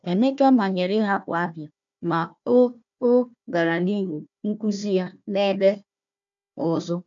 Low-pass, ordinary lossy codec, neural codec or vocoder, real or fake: 7.2 kHz; none; codec, 16 kHz, 1 kbps, FunCodec, trained on Chinese and English, 50 frames a second; fake